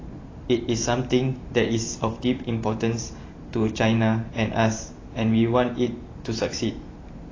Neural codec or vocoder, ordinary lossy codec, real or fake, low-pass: vocoder, 44.1 kHz, 128 mel bands every 512 samples, BigVGAN v2; AAC, 32 kbps; fake; 7.2 kHz